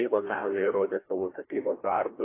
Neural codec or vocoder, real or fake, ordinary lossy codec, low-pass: codec, 16 kHz, 1 kbps, FreqCodec, larger model; fake; AAC, 16 kbps; 3.6 kHz